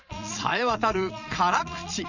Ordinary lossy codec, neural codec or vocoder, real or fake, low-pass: none; vocoder, 22.05 kHz, 80 mel bands, Vocos; fake; 7.2 kHz